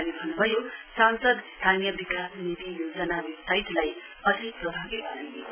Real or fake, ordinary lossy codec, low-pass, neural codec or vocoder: real; none; 3.6 kHz; none